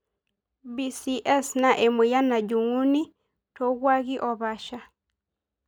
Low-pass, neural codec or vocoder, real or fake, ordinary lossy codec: none; none; real; none